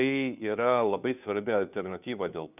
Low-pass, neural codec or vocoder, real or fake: 3.6 kHz; codec, 16 kHz, 2 kbps, FunCodec, trained on Chinese and English, 25 frames a second; fake